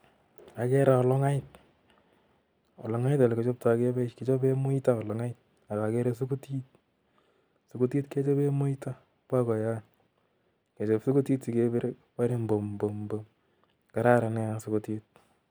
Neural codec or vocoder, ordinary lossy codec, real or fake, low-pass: none; none; real; none